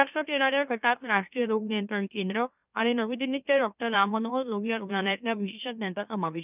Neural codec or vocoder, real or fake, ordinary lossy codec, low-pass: autoencoder, 44.1 kHz, a latent of 192 numbers a frame, MeloTTS; fake; none; 3.6 kHz